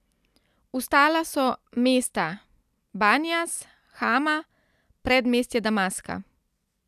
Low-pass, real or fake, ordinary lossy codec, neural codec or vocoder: 14.4 kHz; real; none; none